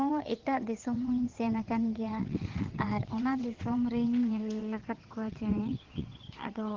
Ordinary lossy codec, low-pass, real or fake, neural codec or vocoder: Opus, 16 kbps; 7.2 kHz; fake; vocoder, 22.05 kHz, 80 mel bands, WaveNeXt